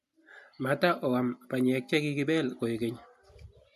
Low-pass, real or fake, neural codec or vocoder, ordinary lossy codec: 14.4 kHz; real; none; none